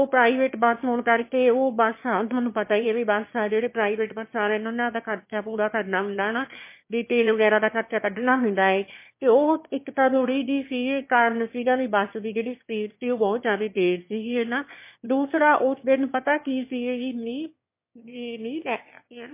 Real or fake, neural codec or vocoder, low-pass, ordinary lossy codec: fake; autoencoder, 22.05 kHz, a latent of 192 numbers a frame, VITS, trained on one speaker; 3.6 kHz; MP3, 24 kbps